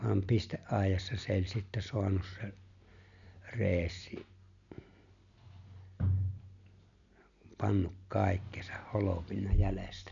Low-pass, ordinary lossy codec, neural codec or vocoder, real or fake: 7.2 kHz; none; none; real